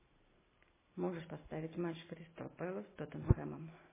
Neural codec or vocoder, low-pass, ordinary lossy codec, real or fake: none; 3.6 kHz; MP3, 16 kbps; real